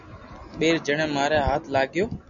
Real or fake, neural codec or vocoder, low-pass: real; none; 7.2 kHz